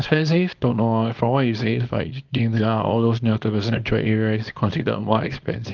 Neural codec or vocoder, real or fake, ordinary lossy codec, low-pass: codec, 24 kHz, 0.9 kbps, WavTokenizer, small release; fake; Opus, 32 kbps; 7.2 kHz